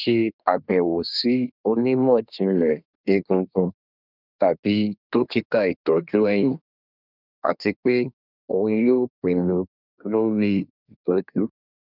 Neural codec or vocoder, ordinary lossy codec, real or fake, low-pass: codec, 24 kHz, 1 kbps, SNAC; none; fake; 5.4 kHz